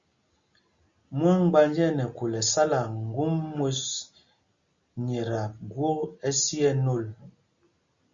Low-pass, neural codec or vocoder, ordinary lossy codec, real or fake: 7.2 kHz; none; Opus, 64 kbps; real